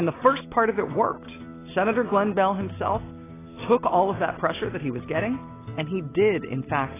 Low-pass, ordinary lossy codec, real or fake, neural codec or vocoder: 3.6 kHz; AAC, 16 kbps; real; none